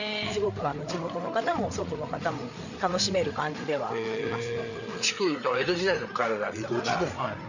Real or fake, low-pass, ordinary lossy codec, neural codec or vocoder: fake; 7.2 kHz; none; codec, 16 kHz, 8 kbps, FreqCodec, larger model